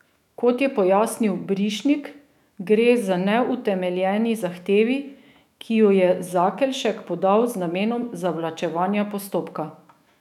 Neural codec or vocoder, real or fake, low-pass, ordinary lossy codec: autoencoder, 48 kHz, 128 numbers a frame, DAC-VAE, trained on Japanese speech; fake; 19.8 kHz; none